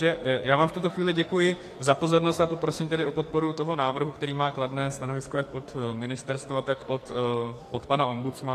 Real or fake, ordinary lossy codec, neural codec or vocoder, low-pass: fake; AAC, 64 kbps; codec, 44.1 kHz, 2.6 kbps, SNAC; 14.4 kHz